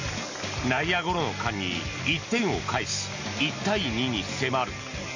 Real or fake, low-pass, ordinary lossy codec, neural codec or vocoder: real; 7.2 kHz; AAC, 48 kbps; none